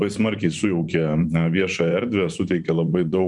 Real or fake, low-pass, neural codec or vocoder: fake; 10.8 kHz; vocoder, 24 kHz, 100 mel bands, Vocos